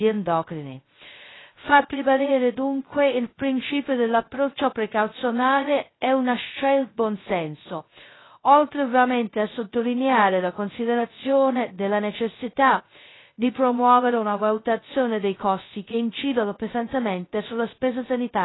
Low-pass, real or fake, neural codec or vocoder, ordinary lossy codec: 7.2 kHz; fake; codec, 16 kHz, 0.2 kbps, FocalCodec; AAC, 16 kbps